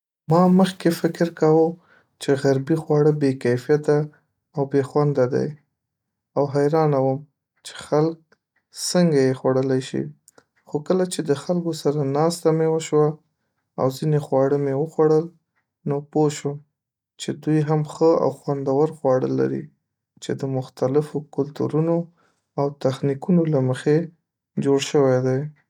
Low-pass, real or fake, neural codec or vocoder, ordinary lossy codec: 19.8 kHz; real; none; none